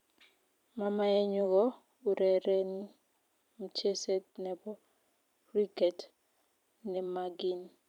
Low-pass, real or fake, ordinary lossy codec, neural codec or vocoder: 19.8 kHz; fake; none; vocoder, 44.1 kHz, 128 mel bands every 512 samples, BigVGAN v2